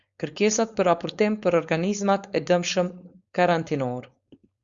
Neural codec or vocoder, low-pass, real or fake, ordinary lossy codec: codec, 16 kHz, 4.8 kbps, FACodec; 7.2 kHz; fake; Opus, 64 kbps